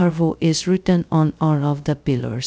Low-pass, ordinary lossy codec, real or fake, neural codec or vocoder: none; none; fake; codec, 16 kHz, 0.2 kbps, FocalCodec